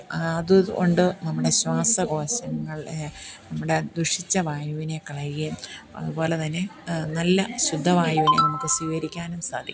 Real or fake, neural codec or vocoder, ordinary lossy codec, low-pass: real; none; none; none